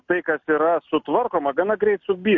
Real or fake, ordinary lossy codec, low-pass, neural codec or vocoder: real; MP3, 48 kbps; 7.2 kHz; none